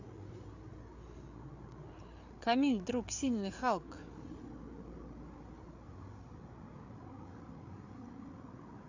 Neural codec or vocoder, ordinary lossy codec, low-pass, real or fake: codec, 16 kHz, 8 kbps, FreqCodec, larger model; AAC, 48 kbps; 7.2 kHz; fake